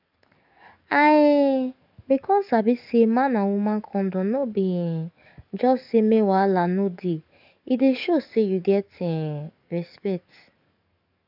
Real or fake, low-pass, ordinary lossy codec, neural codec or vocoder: fake; 5.4 kHz; none; codec, 44.1 kHz, 7.8 kbps, DAC